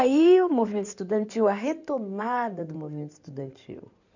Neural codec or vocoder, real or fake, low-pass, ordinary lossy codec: codec, 16 kHz in and 24 kHz out, 2.2 kbps, FireRedTTS-2 codec; fake; 7.2 kHz; none